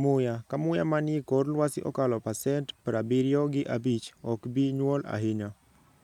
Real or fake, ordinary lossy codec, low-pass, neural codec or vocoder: real; none; 19.8 kHz; none